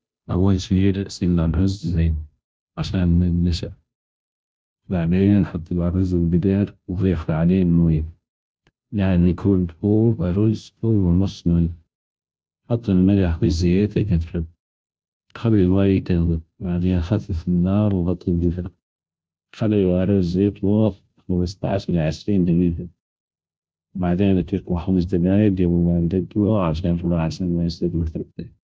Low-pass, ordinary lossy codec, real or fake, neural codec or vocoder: none; none; fake; codec, 16 kHz, 0.5 kbps, FunCodec, trained on Chinese and English, 25 frames a second